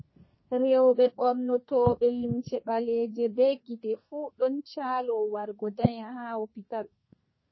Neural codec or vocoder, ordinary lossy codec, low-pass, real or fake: codec, 32 kHz, 1.9 kbps, SNAC; MP3, 24 kbps; 7.2 kHz; fake